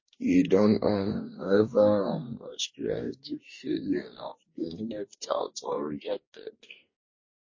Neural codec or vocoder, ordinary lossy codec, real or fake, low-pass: codec, 44.1 kHz, 2.6 kbps, DAC; MP3, 32 kbps; fake; 7.2 kHz